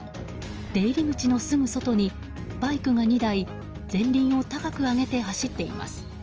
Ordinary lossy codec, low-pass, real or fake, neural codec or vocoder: Opus, 24 kbps; 7.2 kHz; real; none